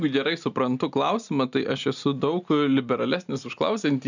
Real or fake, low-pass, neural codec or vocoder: real; 7.2 kHz; none